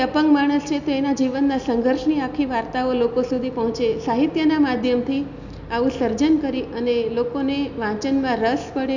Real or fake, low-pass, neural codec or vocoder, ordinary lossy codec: real; 7.2 kHz; none; none